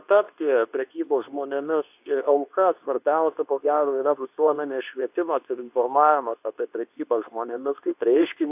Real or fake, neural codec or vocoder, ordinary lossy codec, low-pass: fake; codec, 24 kHz, 0.9 kbps, WavTokenizer, medium speech release version 2; AAC, 32 kbps; 3.6 kHz